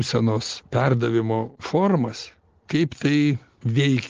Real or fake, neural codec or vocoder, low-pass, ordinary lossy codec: real; none; 7.2 kHz; Opus, 16 kbps